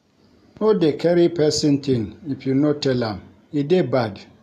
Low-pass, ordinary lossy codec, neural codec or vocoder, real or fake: 14.4 kHz; none; none; real